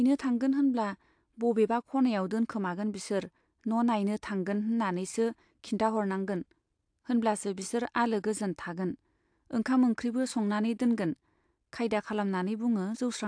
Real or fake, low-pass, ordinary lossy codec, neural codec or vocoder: real; 9.9 kHz; AAC, 64 kbps; none